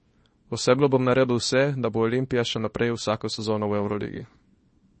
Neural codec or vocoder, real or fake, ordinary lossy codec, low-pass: codec, 24 kHz, 0.9 kbps, WavTokenizer, small release; fake; MP3, 32 kbps; 10.8 kHz